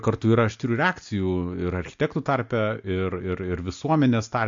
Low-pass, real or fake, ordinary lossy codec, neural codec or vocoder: 7.2 kHz; real; MP3, 48 kbps; none